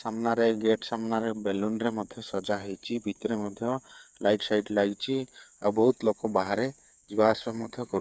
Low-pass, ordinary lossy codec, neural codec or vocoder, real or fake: none; none; codec, 16 kHz, 8 kbps, FreqCodec, smaller model; fake